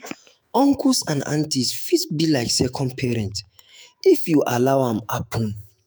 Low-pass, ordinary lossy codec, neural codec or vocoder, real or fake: none; none; autoencoder, 48 kHz, 128 numbers a frame, DAC-VAE, trained on Japanese speech; fake